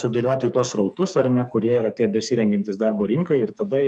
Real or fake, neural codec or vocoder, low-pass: fake; codec, 44.1 kHz, 2.6 kbps, SNAC; 10.8 kHz